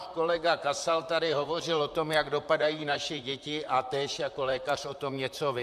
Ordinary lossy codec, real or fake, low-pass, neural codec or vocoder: AAC, 96 kbps; fake; 14.4 kHz; vocoder, 44.1 kHz, 128 mel bands, Pupu-Vocoder